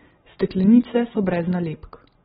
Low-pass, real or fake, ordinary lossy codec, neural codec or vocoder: 19.8 kHz; real; AAC, 16 kbps; none